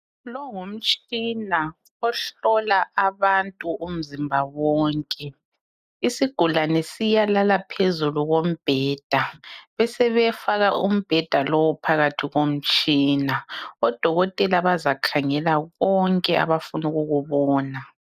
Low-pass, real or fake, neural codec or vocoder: 14.4 kHz; real; none